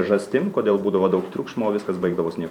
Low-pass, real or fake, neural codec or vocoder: 19.8 kHz; fake; vocoder, 44.1 kHz, 128 mel bands every 512 samples, BigVGAN v2